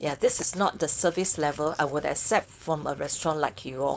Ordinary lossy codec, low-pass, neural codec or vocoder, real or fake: none; none; codec, 16 kHz, 4.8 kbps, FACodec; fake